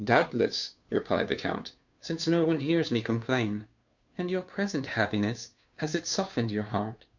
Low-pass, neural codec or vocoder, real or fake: 7.2 kHz; codec, 16 kHz, 2 kbps, FunCodec, trained on Chinese and English, 25 frames a second; fake